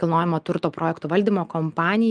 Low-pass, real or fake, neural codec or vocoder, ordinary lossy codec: 9.9 kHz; real; none; Opus, 32 kbps